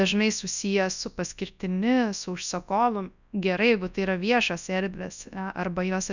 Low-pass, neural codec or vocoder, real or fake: 7.2 kHz; codec, 24 kHz, 0.9 kbps, WavTokenizer, large speech release; fake